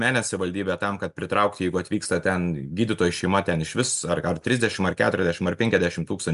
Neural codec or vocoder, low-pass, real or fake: none; 10.8 kHz; real